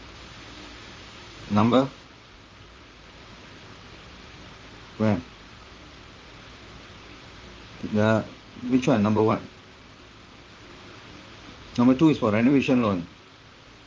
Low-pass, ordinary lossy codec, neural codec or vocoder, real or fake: 7.2 kHz; Opus, 32 kbps; vocoder, 44.1 kHz, 128 mel bands, Pupu-Vocoder; fake